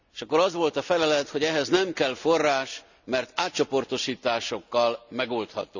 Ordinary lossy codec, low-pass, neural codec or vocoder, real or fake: none; 7.2 kHz; none; real